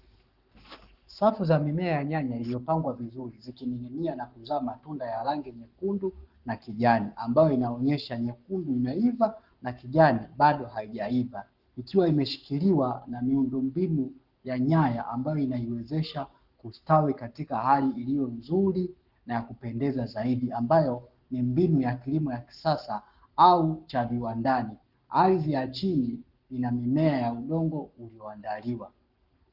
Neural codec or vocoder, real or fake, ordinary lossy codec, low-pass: codec, 44.1 kHz, 7.8 kbps, Pupu-Codec; fake; Opus, 32 kbps; 5.4 kHz